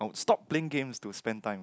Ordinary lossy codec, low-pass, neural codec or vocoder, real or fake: none; none; none; real